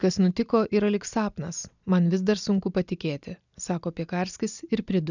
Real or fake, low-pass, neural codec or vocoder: real; 7.2 kHz; none